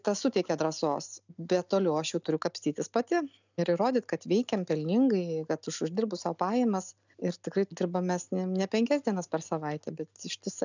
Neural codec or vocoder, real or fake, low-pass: none; real; 7.2 kHz